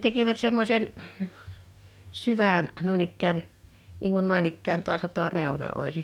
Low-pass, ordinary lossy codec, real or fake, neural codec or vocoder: 19.8 kHz; none; fake; codec, 44.1 kHz, 2.6 kbps, DAC